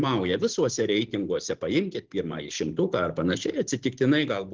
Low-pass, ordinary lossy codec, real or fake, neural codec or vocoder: 7.2 kHz; Opus, 16 kbps; real; none